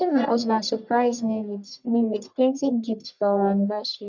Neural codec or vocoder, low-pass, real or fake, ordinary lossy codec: codec, 44.1 kHz, 1.7 kbps, Pupu-Codec; 7.2 kHz; fake; none